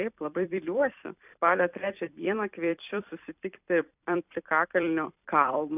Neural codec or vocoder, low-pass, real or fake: vocoder, 44.1 kHz, 128 mel bands every 256 samples, BigVGAN v2; 3.6 kHz; fake